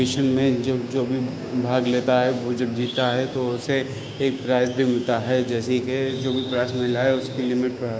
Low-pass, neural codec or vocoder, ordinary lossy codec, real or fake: none; codec, 16 kHz, 6 kbps, DAC; none; fake